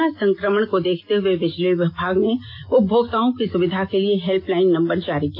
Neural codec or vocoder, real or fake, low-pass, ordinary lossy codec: none; real; 5.4 kHz; AAC, 32 kbps